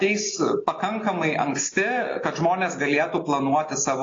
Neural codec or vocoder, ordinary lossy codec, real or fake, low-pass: none; AAC, 32 kbps; real; 7.2 kHz